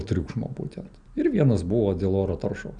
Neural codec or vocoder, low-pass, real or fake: none; 9.9 kHz; real